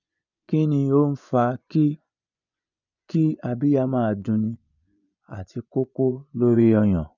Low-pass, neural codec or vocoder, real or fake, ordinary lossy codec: 7.2 kHz; vocoder, 24 kHz, 100 mel bands, Vocos; fake; none